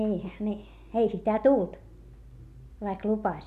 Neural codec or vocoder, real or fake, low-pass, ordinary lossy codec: none; real; 14.4 kHz; none